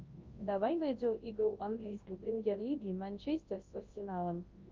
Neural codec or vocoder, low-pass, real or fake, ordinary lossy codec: codec, 24 kHz, 0.9 kbps, WavTokenizer, large speech release; 7.2 kHz; fake; Opus, 32 kbps